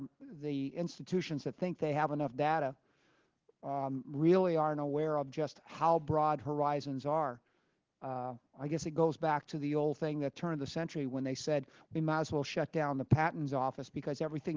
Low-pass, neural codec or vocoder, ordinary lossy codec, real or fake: 7.2 kHz; none; Opus, 16 kbps; real